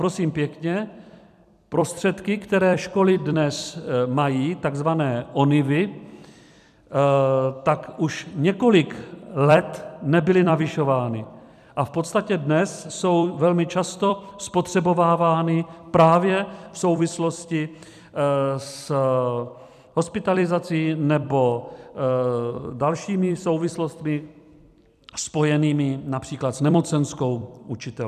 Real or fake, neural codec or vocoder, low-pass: fake; vocoder, 44.1 kHz, 128 mel bands every 256 samples, BigVGAN v2; 14.4 kHz